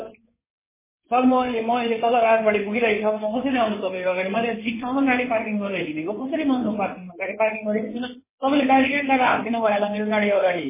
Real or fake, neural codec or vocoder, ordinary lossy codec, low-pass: fake; codec, 16 kHz in and 24 kHz out, 2.2 kbps, FireRedTTS-2 codec; MP3, 16 kbps; 3.6 kHz